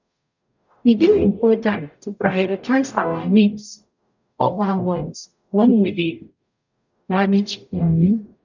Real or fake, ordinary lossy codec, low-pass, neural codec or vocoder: fake; none; 7.2 kHz; codec, 44.1 kHz, 0.9 kbps, DAC